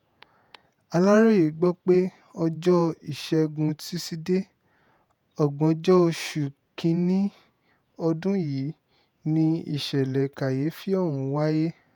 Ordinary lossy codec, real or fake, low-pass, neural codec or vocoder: none; fake; 19.8 kHz; vocoder, 48 kHz, 128 mel bands, Vocos